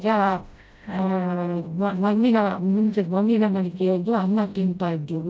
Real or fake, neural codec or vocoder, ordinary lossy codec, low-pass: fake; codec, 16 kHz, 0.5 kbps, FreqCodec, smaller model; none; none